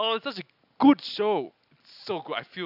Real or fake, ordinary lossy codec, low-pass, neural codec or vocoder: real; none; 5.4 kHz; none